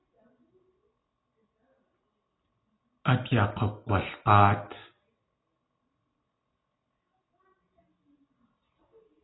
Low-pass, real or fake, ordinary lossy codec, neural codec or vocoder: 7.2 kHz; real; AAC, 16 kbps; none